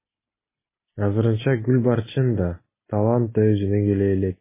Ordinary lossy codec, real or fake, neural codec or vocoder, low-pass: MP3, 16 kbps; real; none; 3.6 kHz